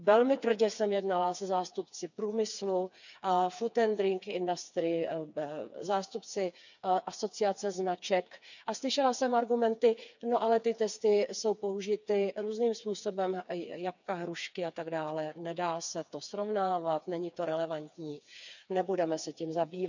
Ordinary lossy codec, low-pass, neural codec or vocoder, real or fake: none; 7.2 kHz; codec, 16 kHz, 4 kbps, FreqCodec, smaller model; fake